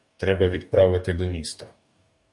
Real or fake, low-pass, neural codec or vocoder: fake; 10.8 kHz; codec, 44.1 kHz, 2.6 kbps, DAC